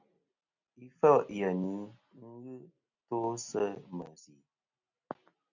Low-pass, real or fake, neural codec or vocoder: 7.2 kHz; real; none